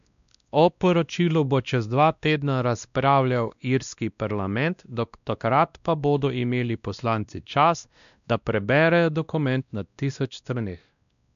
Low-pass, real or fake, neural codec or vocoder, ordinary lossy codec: 7.2 kHz; fake; codec, 16 kHz, 1 kbps, X-Codec, WavLM features, trained on Multilingual LibriSpeech; none